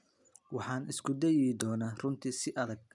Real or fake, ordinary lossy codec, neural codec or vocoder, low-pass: real; none; none; 10.8 kHz